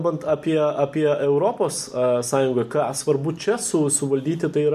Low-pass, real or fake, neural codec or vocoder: 14.4 kHz; real; none